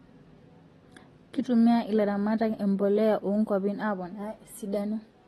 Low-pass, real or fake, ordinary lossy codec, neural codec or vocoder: 19.8 kHz; real; AAC, 32 kbps; none